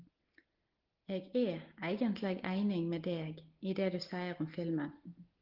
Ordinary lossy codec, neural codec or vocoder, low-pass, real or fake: Opus, 16 kbps; none; 5.4 kHz; real